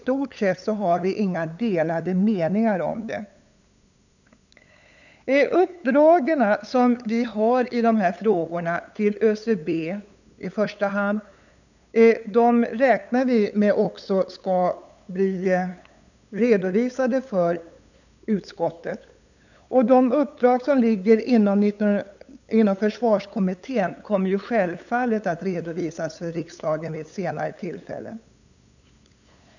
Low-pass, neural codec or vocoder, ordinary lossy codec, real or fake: 7.2 kHz; codec, 16 kHz, 8 kbps, FunCodec, trained on LibriTTS, 25 frames a second; none; fake